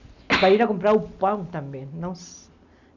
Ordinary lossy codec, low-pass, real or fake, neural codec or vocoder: none; 7.2 kHz; real; none